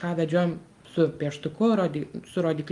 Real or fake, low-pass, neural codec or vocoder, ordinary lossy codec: real; 10.8 kHz; none; Opus, 32 kbps